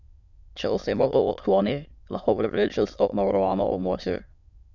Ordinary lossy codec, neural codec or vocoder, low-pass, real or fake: none; autoencoder, 22.05 kHz, a latent of 192 numbers a frame, VITS, trained on many speakers; 7.2 kHz; fake